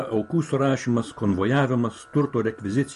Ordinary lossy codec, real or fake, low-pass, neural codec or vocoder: MP3, 48 kbps; real; 14.4 kHz; none